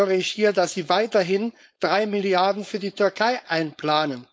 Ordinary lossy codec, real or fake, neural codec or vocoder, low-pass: none; fake; codec, 16 kHz, 4.8 kbps, FACodec; none